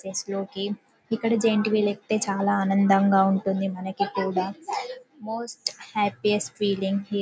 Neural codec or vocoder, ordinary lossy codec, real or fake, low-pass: none; none; real; none